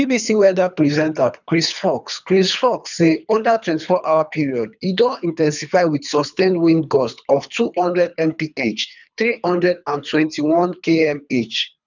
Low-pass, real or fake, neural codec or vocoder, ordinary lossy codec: 7.2 kHz; fake; codec, 24 kHz, 3 kbps, HILCodec; none